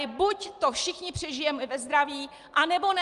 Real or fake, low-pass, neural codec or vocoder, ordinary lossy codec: real; 10.8 kHz; none; Opus, 32 kbps